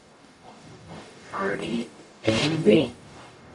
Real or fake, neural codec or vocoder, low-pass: fake; codec, 44.1 kHz, 0.9 kbps, DAC; 10.8 kHz